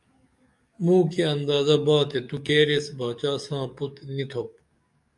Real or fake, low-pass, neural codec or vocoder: fake; 10.8 kHz; codec, 44.1 kHz, 7.8 kbps, DAC